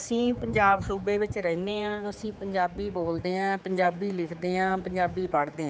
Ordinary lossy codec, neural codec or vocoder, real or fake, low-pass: none; codec, 16 kHz, 4 kbps, X-Codec, HuBERT features, trained on general audio; fake; none